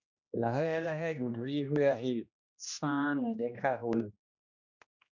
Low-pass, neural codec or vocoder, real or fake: 7.2 kHz; codec, 16 kHz, 1 kbps, X-Codec, HuBERT features, trained on general audio; fake